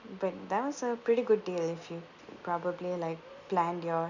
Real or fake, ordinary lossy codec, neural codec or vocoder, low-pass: real; none; none; 7.2 kHz